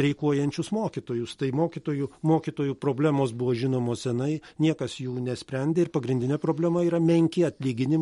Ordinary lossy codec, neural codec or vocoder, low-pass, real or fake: MP3, 48 kbps; none; 19.8 kHz; real